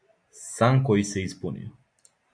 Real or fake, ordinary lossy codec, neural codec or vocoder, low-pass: real; MP3, 64 kbps; none; 9.9 kHz